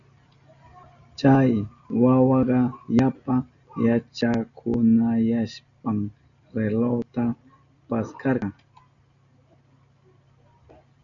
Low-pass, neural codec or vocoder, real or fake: 7.2 kHz; none; real